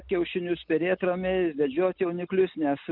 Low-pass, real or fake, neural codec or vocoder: 5.4 kHz; real; none